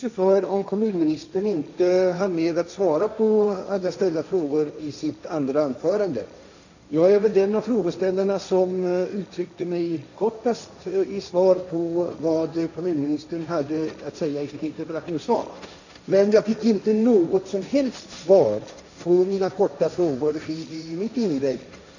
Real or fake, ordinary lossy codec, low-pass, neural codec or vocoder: fake; none; 7.2 kHz; codec, 16 kHz, 1.1 kbps, Voila-Tokenizer